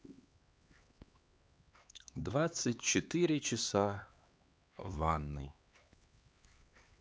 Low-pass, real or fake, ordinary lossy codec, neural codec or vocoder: none; fake; none; codec, 16 kHz, 2 kbps, X-Codec, HuBERT features, trained on LibriSpeech